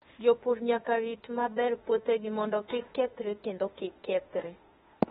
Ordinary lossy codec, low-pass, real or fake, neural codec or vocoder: AAC, 16 kbps; 19.8 kHz; fake; autoencoder, 48 kHz, 32 numbers a frame, DAC-VAE, trained on Japanese speech